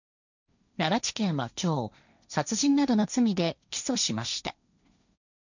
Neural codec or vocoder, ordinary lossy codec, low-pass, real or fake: codec, 16 kHz, 1.1 kbps, Voila-Tokenizer; none; 7.2 kHz; fake